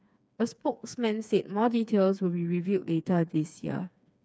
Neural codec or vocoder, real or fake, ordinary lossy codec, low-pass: codec, 16 kHz, 4 kbps, FreqCodec, smaller model; fake; none; none